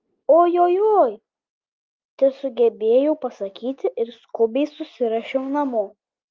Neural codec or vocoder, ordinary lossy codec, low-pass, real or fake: none; Opus, 32 kbps; 7.2 kHz; real